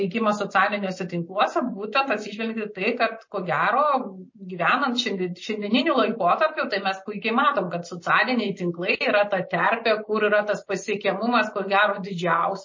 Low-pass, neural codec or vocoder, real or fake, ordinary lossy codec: 7.2 kHz; none; real; MP3, 32 kbps